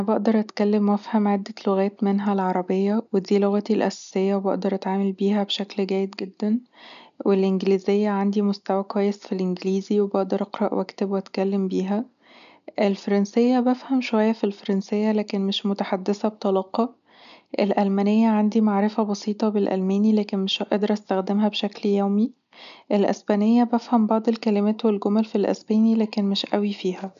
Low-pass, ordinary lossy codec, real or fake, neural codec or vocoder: 7.2 kHz; none; real; none